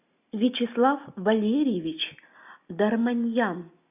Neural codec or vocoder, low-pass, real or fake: none; 3.6 kHz; real